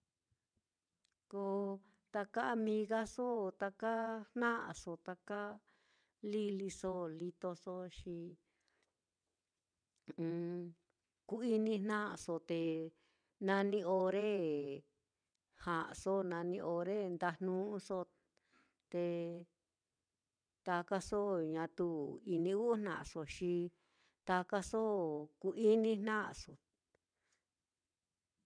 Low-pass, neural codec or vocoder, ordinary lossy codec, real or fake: 9.9 kHz; vocoder, 22.05 kHz, 80 mel bands, WaveNeXt; none; fake